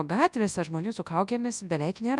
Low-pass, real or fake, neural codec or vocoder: 10.8 kHz; fake; codec, 24 kHz, 0.9 kbps, WavTokenizer, large speech release